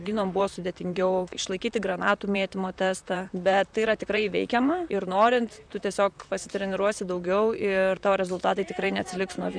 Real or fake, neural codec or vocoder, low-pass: fake; vocoder, 44.1 kHz, 128 mel bands, Pupu-Vocoder; 9.9 kHz